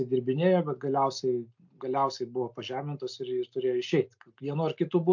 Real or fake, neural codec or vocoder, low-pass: real; none; 7.2 kHz